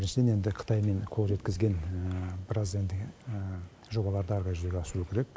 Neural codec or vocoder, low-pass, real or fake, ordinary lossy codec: none; none; real; none